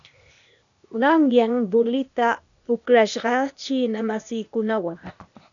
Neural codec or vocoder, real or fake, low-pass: codec, 16 kHz, 0.8 kbps, ZipCodec; fake; 7.2 kHz